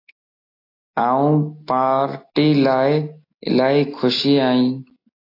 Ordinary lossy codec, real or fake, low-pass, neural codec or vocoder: AAC, 32 kbps; real; 5.4 kHz; none